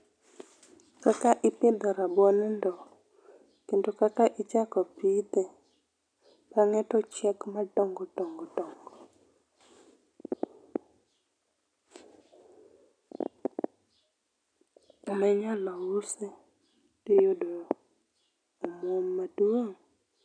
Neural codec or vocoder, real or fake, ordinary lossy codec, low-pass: none; real; none; 9.9 kHz